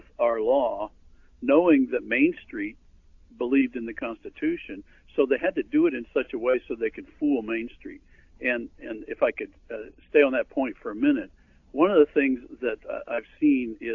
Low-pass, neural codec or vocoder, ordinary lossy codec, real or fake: 7.2 kHz; none; AAC, 48 kbps; real